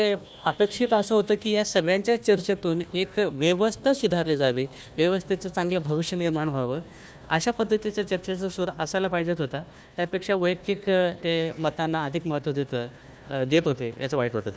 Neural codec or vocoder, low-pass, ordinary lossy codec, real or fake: codec, 16 kHz, 1 kbps, FunCodec, trained on Chinese and English, 50 frames a second; none; none; fake